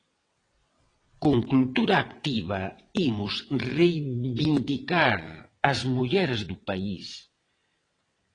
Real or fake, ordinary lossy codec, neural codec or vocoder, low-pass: fake; AAC, 32 kbps; vocoder, 22.05 kHz, 80 mel bands, WaveNeXt; 9.9 kHz